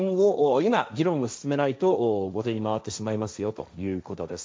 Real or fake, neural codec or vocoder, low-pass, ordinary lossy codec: fake; codec, 16 kHz, 1.1 kbps, Voila-Tokenizer; none; none